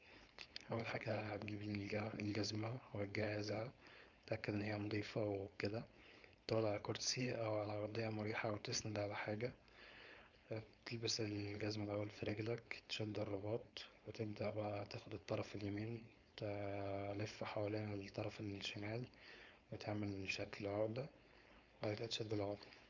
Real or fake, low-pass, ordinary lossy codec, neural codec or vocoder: fake; 7.2 kHz; Opus, 64 kbps; codec, 16 kHz, 4.8 kbps, FACodec